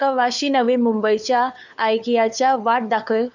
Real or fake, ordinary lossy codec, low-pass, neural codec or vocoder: fake; none; 7.2 kHz; codec, 16 kHz, 4 kbps, FunCodec, trained on LibriTTS, 50 frames a second